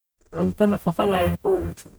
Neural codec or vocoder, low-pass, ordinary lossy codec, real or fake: codec, 44.1 kHz, 0.9 kbps, DAC; none; none; fake